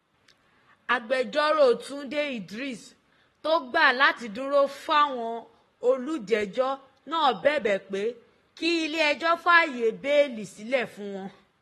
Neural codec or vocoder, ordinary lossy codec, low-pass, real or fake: codec, 44.1 kHz, 7.8 kbps, Pupu-Codec; AAC, 32 kbps; 19.8 kHz; fake